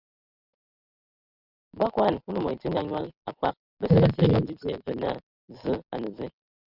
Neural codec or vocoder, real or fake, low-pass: none; real; 5.4 kHz